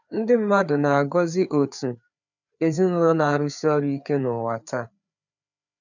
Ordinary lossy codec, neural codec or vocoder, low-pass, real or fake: none; codec, 16 kHz, 4 kbps, FreqCodec, larger model; 7.2 kHz; fake